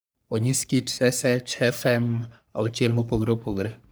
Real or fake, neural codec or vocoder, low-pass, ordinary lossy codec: fake; codec, 44.1 kHz, 3.4 kbps, Pupu-Codec; none; none